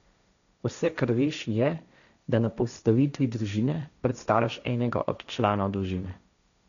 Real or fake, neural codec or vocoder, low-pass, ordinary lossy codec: fake; codec, 16 kHz, 1.1 kbps, Voila-Tokenizer; 7.2 kHz; none